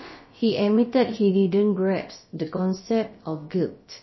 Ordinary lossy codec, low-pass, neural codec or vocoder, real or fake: MP3, 24 kbps; 7.2 kHz; codec, 16 kHz, about 1 kbps, DyCAST, with the encoder's durations; fake